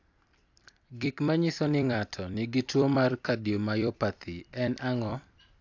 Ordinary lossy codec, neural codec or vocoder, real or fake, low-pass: AAC, 48 kbps; vocoder, 44.1 kHz, 128 mel bands every 256 samples, BigVGAN v2; fake; 7.2 kHz